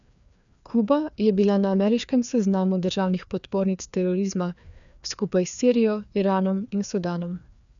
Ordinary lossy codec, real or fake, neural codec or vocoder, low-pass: none; fake; codec, 16 kHz, 2 kbps, FreqCodec, larger model; 7.2 kHz